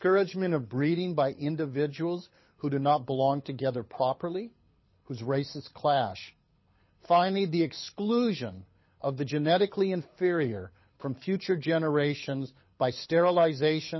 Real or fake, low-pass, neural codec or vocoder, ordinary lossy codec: fake; 7.2 kHz; codec, 24 kHz, 6 kbps, HILCodec; MP3, 24 kbps